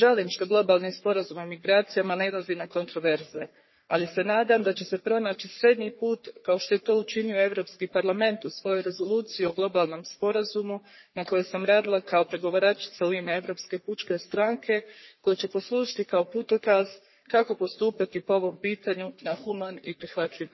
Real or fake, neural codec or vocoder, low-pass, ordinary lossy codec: fake; codec, 44.1 kHz, 3.4 kbps, Pupu-Codec; 7.2 kHz; MP3, 24 kbps